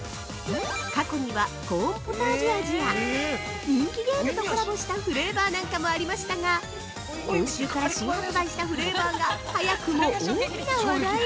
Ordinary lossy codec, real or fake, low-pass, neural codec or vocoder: none; real; none; none